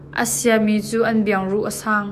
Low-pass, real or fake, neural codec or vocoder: 14.4 kHz; fake; autoencoder, 48 kHz, 128 numbers a frame, DAC-VAE, trained on Japanese speech